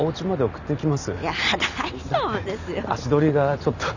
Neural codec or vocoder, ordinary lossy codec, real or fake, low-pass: none; none; real; 7.2 kHz